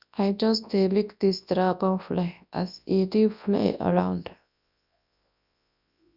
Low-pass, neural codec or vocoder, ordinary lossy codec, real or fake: 5.4 kHz; codec, 24 kHz, 0.9 kbps, WavTokenizer, large speech release; none; fake